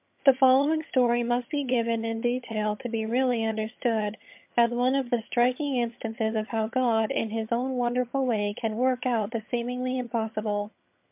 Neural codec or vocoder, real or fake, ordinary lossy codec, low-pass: vocoder, 22.05 kHz, 80 mel bands, HiFi-GAN; fake; MP3, 32 kbps; 3.6 kHz